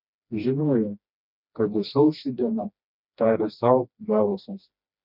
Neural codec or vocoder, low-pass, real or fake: codec, 16 kHz, 1 kbps, FreqCodec, smaller model; 5.4 kHz; fake